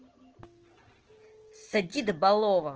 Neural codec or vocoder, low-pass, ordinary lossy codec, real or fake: none; 7.2 kHz; Opus, 16 kbps; real